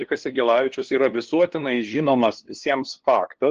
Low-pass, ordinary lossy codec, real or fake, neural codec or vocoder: 7.2 kHz; Opus, 16 kbps; fake; codec, 16 kHz, 2 kbps, FunCodec, trained on LibriTTS, 25 frames a second